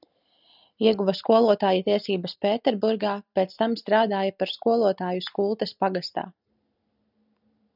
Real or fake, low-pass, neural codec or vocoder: real; 5.4 kHz; none